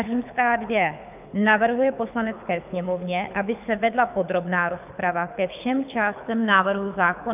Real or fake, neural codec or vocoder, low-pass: fake; codec, 24 kHz, 6 kbps, HILCodec; 3.6 kHz